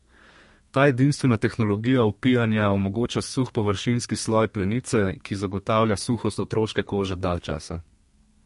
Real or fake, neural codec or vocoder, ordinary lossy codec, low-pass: fake; codec, 32 kHz, 1.9 kbps, SNAC; MP3, 48 kbps; 14.4 kHz